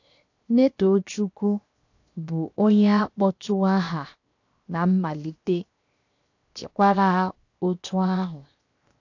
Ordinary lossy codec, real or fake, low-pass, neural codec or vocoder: AAC, 48 kbps; fake; 7.2 kHz; codec, 16 kHz, 0.7 kbps, FocalCodec